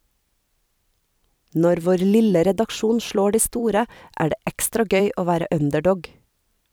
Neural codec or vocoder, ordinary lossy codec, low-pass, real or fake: vocoder, 44.1 kHz, 128 mel bands every 256 samples, BigVGAN v2; none; none; fake